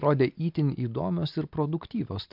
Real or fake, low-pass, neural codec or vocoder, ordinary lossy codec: real; 5.4 kHz; none; MP3, 48 kbps